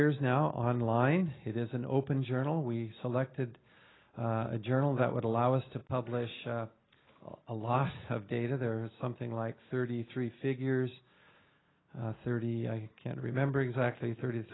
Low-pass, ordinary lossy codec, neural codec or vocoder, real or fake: 7.2 kHz; AAC, 16 kbps; none; real